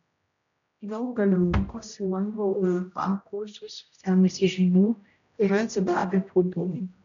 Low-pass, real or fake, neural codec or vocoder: 7.2 kHz; fake; codec, 16 kHz, 0.5 kbps, X-Codec, HuBERT features, trained on general audio